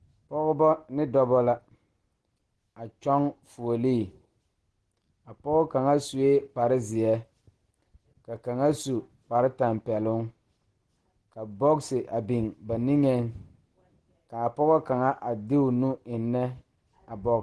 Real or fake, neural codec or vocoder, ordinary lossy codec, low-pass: real; none; Opus, 16 kbps; 10.8 kHz